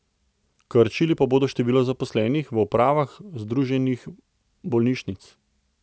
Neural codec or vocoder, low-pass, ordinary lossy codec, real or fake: none; none; none; real